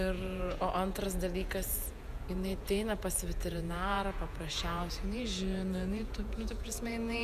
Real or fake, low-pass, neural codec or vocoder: fake; 14.4 kHz; vocoder, 48 kHz, 128 mel bands, Vocos